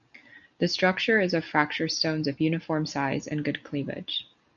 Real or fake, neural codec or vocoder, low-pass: real; none; 7.2 kHz